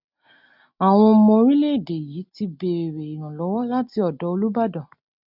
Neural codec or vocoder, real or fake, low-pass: none; real; 5.4 kHz